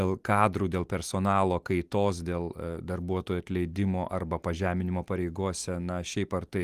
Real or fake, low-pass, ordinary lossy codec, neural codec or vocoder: real; 14.4 kHz; Opus, 24 kbps; none